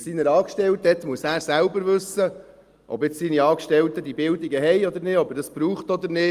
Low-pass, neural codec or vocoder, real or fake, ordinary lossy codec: 14.4 kHz; none; real; Opus, 32 kbps